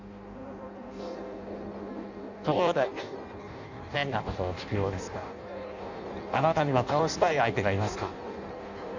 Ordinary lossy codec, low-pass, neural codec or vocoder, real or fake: none; 7.2 kHz; codec, 16 kHz in and 24 kHz out, 0.6 kbps, FireRedTTS-2 codec; fake